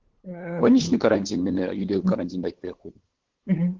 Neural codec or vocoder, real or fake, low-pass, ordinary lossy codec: codec, 16 kHz, 8 kbps, FunCodec, trained on LibriTTS, 25 frames a second; fake; 7.2 kHz; Opus, 16 kbps